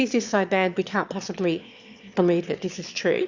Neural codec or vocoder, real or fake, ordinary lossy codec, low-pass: autoencoder, 22.05 kHz, a latent of 192 numbers a frame, VITS, trained on one speaker; fake; Opus, 64 kbps; 7.2 kHz